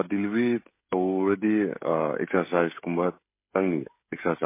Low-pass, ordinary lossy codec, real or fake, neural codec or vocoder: 3.6 kHz; MP3, 24 kbps; fake; codec, 16 kHz, 16 kbps, FreqCodec, smaller model